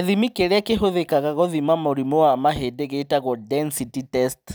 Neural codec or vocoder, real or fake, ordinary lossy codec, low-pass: none; real; none; none